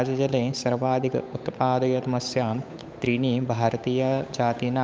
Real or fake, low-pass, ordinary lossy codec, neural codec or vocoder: fake; none; none; codec, 16 kHz, 8 kbps, FunCodec, trained on Chinese and English, 25 frames a second